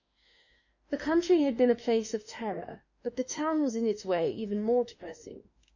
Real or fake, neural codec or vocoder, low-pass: fake; autoencoder, 48 kHz, 32 numbers a frame, DAC-VAE, trained on Japanese speech; 7.2 kHz